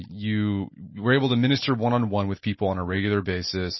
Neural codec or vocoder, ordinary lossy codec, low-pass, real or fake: none; MP3, 24 kbps; 7.2 kHz; real